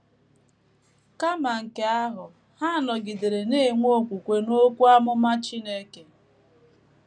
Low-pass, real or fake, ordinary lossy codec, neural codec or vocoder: 9.9 kHz; real; none; none